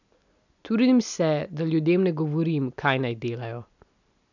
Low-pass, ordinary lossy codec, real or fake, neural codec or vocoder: 7.2 kHz; none; real; none